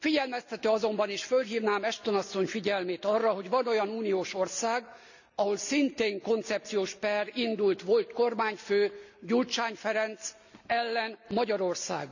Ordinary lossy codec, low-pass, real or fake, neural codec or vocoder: none; 7.2 kHz; real; none